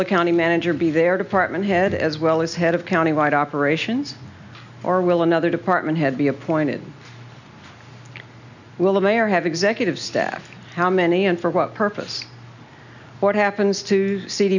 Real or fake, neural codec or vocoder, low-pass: real; none; 7.2 kHz